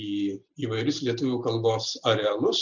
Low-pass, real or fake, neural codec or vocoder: 7.2 kHz; real; none